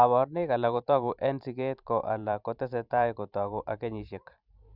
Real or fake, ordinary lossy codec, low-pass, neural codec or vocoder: fake; none; 5.4 kHz; vocoder, 44.1 kHz, 128 mel bands every 512 samples, BigVGAN v2